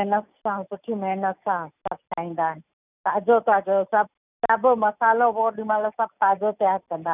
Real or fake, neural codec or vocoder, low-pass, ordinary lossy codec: real; none; 3.6 kHz; none